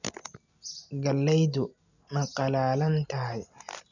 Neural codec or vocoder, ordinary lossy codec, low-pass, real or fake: none; none; 7.2 kHz; real